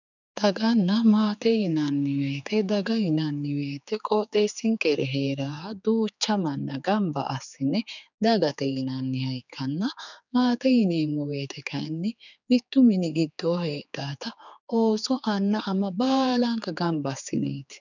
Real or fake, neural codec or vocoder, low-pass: fake; codec, 16 kHz, 4 kbps, X-Codec, HuBERT features, trained on general audio; 7.2 kHz